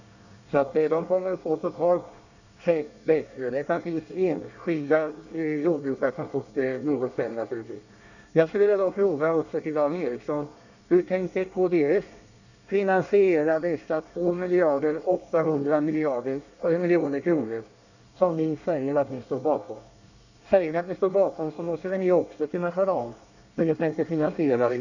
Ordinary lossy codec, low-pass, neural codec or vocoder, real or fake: none; 7.2 kHz; codec, 24 kHz, 1 kbps, SNAC; fake